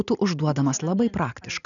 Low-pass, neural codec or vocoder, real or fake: 7.2 kHz; none; real